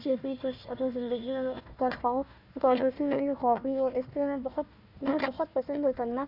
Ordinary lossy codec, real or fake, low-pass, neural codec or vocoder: none; fake; 5.4 kHz; codec, 16 kHz, 1 kbps, FunCodec, trained on Chinese and English, 50 frames a second